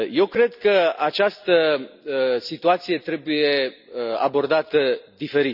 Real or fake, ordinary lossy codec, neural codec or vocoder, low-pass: real; none; none; 5.4 kHz